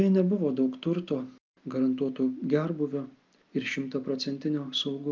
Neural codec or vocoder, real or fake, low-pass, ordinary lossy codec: none; real; 7.2 kHz; Opus, 24 kbps